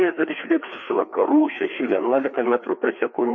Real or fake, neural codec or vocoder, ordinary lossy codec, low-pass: fake; codec, 16 kHz, 2 kbps, FreqCodec, larger model; MP3, 32 kbps; 7.2 kHz